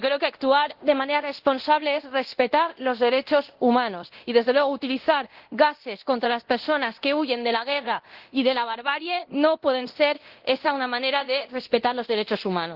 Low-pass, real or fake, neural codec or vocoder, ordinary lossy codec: 5.4 kHz; fake; codec, 24 kHz, 0.9 kbps, DualCodec; Opus, 16 kbps